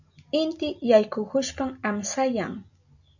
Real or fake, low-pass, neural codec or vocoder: real; 7.2 kHz; none